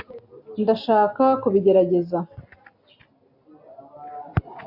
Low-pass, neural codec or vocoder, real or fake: 5.4 kHz; none; real